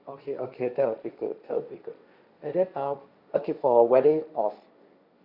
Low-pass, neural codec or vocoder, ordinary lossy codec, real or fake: 5.4 kHz; codec, 16 kHz, 1.1 kbps, Voila-Tokenizer; Opus, 64 kbps; fake